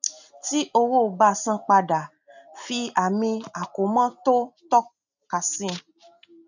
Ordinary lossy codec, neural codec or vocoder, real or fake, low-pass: none; none; real; 7.2 kHz